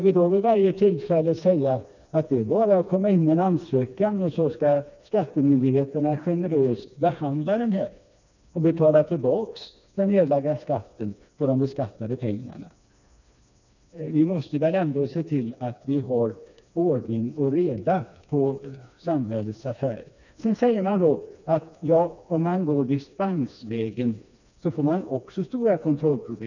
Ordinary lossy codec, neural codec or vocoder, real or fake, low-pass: none; codec, 16 kHz, 2 kbps, FreqCodec, smaller model; fake; 7.2 kHz